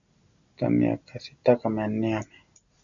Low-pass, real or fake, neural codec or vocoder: 7.2 kHz; real; none